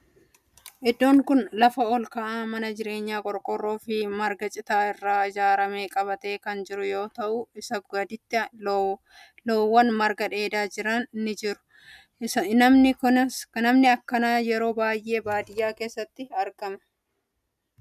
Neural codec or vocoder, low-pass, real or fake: none; 14.4 kHz; real